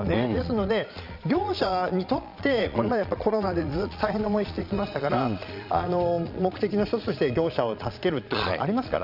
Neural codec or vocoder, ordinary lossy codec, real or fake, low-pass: vocoder, 22.05 kHz, 80 mel bands, Vocos; none; fake; 5.4 kHz